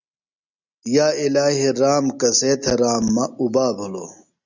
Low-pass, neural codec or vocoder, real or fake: 7.2 kHz; none; real